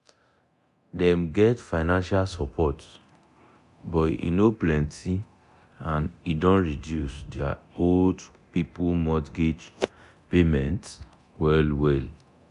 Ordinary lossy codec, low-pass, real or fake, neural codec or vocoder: AAC, 96 kbps; 10.8 kHz; fake; codec, 24 kHz, 0.9 kbps, DualCodec